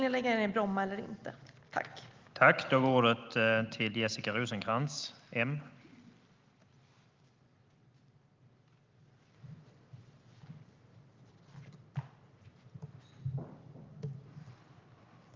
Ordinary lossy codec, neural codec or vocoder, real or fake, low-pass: Opus, 32 kbps; none; real; 7.2 kHz